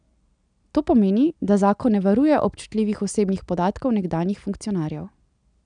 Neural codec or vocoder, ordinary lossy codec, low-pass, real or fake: none; none; 9.9 kHz; real